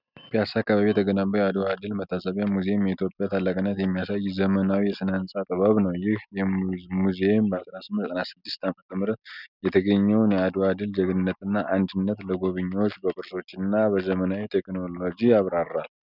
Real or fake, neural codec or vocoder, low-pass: real; none; 5.4 kHz